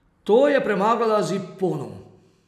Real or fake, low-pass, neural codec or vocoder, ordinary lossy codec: real; 14.4 kHz; none; none